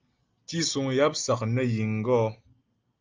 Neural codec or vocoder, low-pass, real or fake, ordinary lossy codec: none; 7.2 kHz; real; Opus, 24 kbps